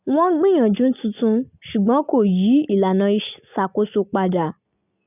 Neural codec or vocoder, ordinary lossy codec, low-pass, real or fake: none; none; 3.6 kHz; real